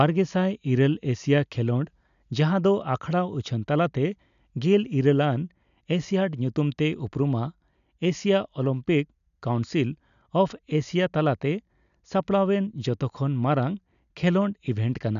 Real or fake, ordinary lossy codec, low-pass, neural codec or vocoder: real; none; 7.2 kHz; none